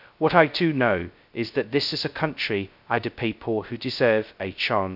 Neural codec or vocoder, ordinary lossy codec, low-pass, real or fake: codec, 16 kHz, 0.2 kbps, FocalCodec; none; 5.4 kHz; fake